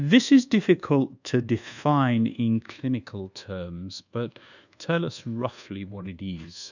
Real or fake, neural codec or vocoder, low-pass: fake; codec, 24 kHz, 1.2 kbps, DualCodec; 7.2 kHz